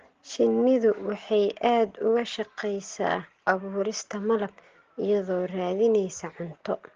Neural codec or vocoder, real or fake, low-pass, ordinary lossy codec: none; real; 7.2 kHz; Opus, 16 kbps